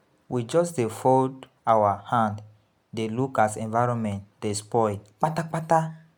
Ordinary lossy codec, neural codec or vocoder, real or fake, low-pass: none; none; real; none